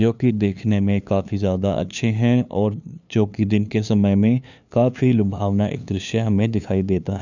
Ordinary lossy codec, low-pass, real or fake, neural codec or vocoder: none; 7.2 kHz; fake; codec, 16 kHz, 2 kbps, FunCodec, trained on LibriTTS, 25 frames a second